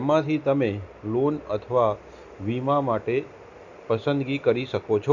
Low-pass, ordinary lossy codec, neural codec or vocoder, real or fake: 7.2 kHz; none; none; real